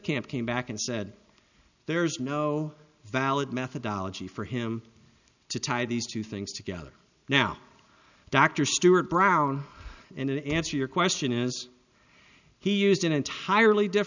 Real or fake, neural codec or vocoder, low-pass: real; none; 7.2 kHz